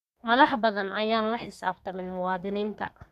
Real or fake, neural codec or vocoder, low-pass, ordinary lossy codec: fake; codec, 32 kHz, 1.9 kbps, SNAC; 14.4 kHz; none